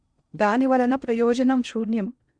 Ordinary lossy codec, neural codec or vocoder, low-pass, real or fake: Opus, 64 kbps; codec, 16 kHz in and 24 kHz out, 0.8 kbps, FocalCodec, streaming, 65536 codes; 9.9 kHz; fake